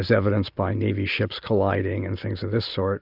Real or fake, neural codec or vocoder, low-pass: real; none; 5.4 kHz